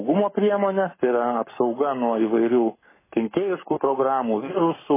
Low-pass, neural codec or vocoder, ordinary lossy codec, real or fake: 3.6 kHz; codec, 44.1 kHz, 7.8 kbps, Pupu-Codec; MP3, 16 kbps; fake